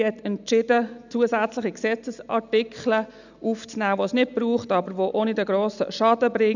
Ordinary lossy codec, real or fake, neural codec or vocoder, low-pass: none; real; none; 7.2 kHz